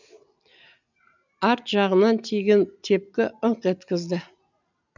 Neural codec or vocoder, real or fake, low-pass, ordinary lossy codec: none; real; 7.2 kHz; none